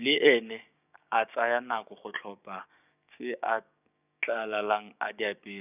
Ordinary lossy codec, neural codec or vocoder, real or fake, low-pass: none; codec, 16 kHz, 6 kbps, DAC; fake; 3.6 kHz